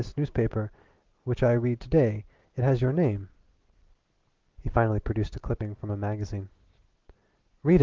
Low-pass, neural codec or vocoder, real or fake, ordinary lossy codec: 7.2 kHz; none; real; Opus, 16 kbps